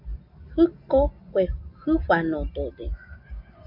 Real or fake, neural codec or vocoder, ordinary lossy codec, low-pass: real; none; MP3, 48 kbps; 5.4 kHz